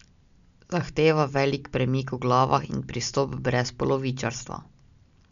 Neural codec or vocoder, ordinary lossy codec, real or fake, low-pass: none; none; real; 7.2 kHz